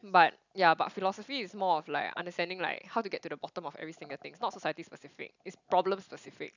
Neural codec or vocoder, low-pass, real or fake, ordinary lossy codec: none; 7.2 kHz; real; none